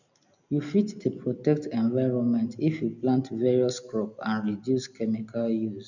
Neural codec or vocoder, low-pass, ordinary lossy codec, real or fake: none; 7.2 kHz; none; real